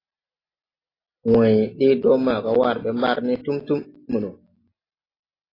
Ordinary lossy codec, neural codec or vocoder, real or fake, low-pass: AAC, 24 kbps; none; real; 5.4 kHz